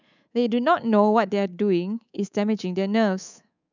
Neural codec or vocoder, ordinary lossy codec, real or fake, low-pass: codec, 24 kHz, 3.1 kbps, DualCodec; none; fake; 7.2 kHz